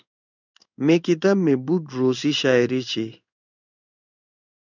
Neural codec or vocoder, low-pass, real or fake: codec, 16 kHz in and 24 kHz out, 1 kbps, XY-Tokenizer; 7.2 kHz; fake